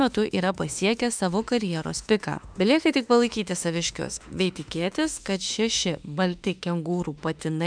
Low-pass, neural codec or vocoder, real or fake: 9.9 kHz; autoencoder, 48 kHz, 32 numbers a frame, DAC-VAE, trained on Japanese speech; fake